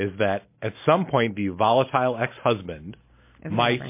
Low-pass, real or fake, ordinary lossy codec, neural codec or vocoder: 3.6 kHz; real; MP3, 24 kbps; none